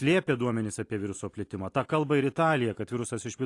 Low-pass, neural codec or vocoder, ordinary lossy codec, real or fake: 10.8 kHz; none; AAC, 48 kbps; real